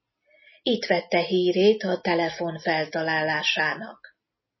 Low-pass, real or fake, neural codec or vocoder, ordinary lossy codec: 7.2 kHz; real; none; MP3, 24 kbps